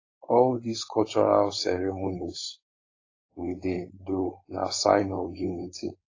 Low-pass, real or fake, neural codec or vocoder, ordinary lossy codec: 7.2 kHz; fake; codec, 16 kHz, 4.8 kbps, FACodec; AAC, 32 kbps